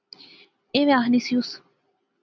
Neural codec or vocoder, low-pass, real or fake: none; 7.2 kHz; real